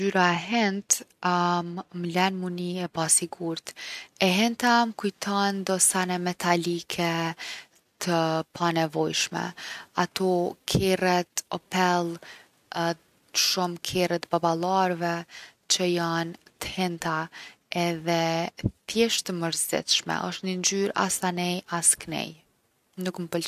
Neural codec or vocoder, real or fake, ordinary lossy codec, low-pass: none; real; none; 14.4 kHz